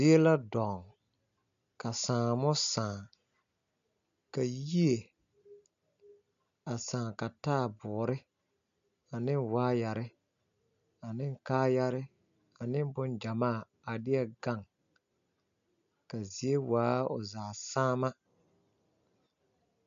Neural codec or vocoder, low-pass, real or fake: none; 7.2 kHz; real